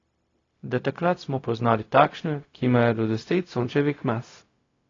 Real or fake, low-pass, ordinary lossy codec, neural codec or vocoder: fake; 7.2 kHz; AAC, 32 kbps; codec, 16 kHz, 0.4 kbps, LongCat-Audio-Codec